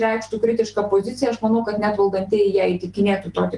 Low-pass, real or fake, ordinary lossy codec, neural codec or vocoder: 10.8 kHz; real; Opus, 32 kbps; none